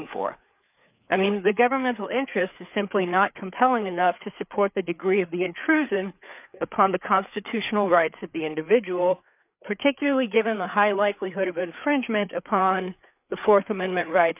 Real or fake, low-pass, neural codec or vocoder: fake; 3.6 kHz; codec, 16 kHz, 4 kbps, FreqCodec, larger model